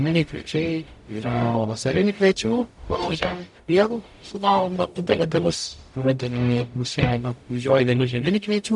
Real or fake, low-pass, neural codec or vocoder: fake; 10.8 kHz; codec, 44.1 kHz, 0.9 kbps, DAC